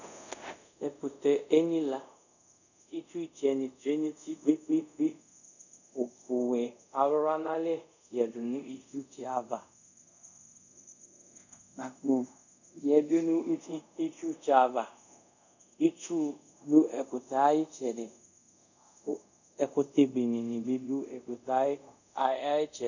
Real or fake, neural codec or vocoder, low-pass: fake; codec, 24 kHz, 0.5 kbps, DualCodec; 7.2 kHz